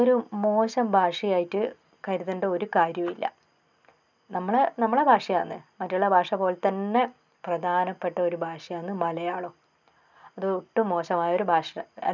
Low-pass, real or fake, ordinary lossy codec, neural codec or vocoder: 7.2 kHz; real; none; none